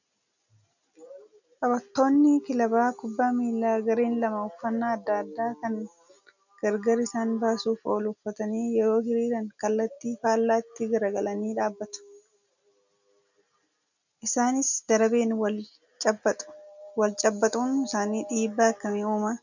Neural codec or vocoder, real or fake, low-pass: none; real; 7.2 kHz